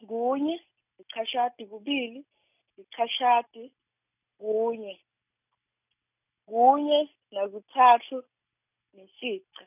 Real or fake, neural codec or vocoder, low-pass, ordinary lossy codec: real; none; 3.6 kHz; none